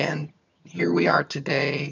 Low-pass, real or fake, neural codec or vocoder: 7.2 kHz; fake; vocoder, 22.05 kHz, 80 mel bands, HiFi-GAN